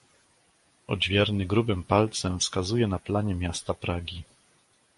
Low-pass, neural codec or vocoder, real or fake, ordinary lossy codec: 14.4 kHz; vocoder, 48 kHz, 128 mel bands, Vocos; fake; MP3, 48 kbps